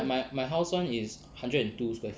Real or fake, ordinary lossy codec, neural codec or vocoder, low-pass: real; none; none; none